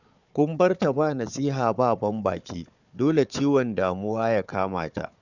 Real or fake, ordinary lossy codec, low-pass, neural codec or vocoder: fake; none; 7.2 kHz; codec, 16 kHz, 4 kbps, FunCodec, trained on Chinese and English, 50 frames a second